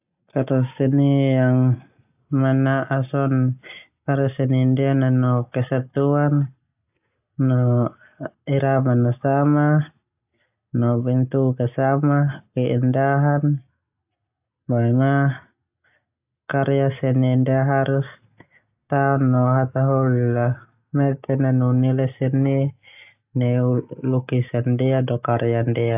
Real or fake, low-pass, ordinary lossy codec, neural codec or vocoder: real; 3.6 kHz; AAC, 32 kbps; none